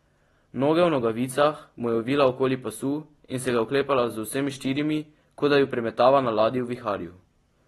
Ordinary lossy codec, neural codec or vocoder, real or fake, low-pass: AAC, 32 kbps; none; real; 19.8 kHz